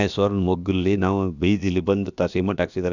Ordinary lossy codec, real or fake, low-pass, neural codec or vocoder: none; fake; 7.2 kHz; codec, 16 kHz, about 1 kbps, DyCAST, with the encoder's durations